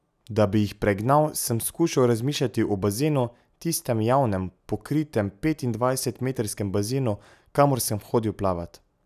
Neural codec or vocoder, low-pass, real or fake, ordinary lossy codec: none; 14.4 kHz; real; none